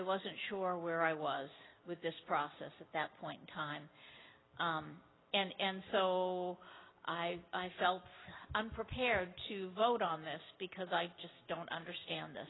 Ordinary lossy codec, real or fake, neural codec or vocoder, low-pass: AAC, 16 kbps; real; none; 7.2 kHz